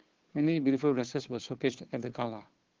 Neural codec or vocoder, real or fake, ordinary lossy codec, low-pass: codec, 16 kHz, 2 kbps, FunCodec, trained on Chinese and English, 25 frames a second; fake; Opus, 16 kbps; 7.2 kHz